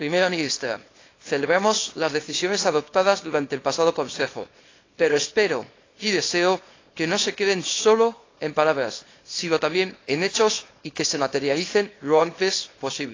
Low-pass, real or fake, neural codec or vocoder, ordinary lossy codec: 7.2 kHz; fake; codec, 24 kHz, 0.9 kbps, WavTokenizer, small release; AAC, 32 kbps